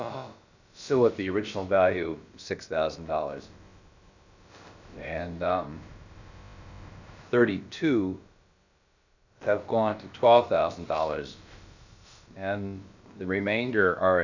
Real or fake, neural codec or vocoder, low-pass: fake; codec, 16 kHz, about 1 kbps, DyCAST, with the encoder's durations; 7.2 kHz